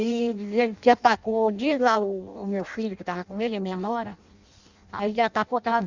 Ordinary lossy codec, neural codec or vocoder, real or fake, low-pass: Opus, 64 kbps; codec, 16 kHz in and 24 kHz out, 0.6 kbps, FireRedTTS-2 codec; fake; 7.2 kHz